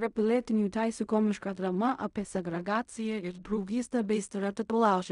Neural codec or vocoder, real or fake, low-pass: codec, 16 kHz in and 24 kHz out, 0.4 kbps, LongCat-Audio-Codec, fine tuned four codebook decoder; fake; 10.8 kHz